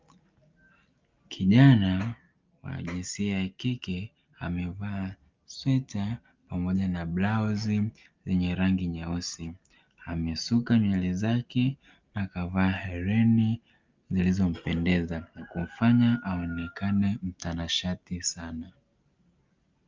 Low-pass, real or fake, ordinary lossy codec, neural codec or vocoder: 7.2 kHz; real; Opus, 24 kbps; none